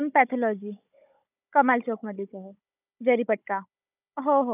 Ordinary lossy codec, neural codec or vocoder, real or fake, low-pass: none; codec, 16 kHz, 16 kbps, FunCodec, trained on Chinese and English, 50 frames a second; fake; 3.6 kHz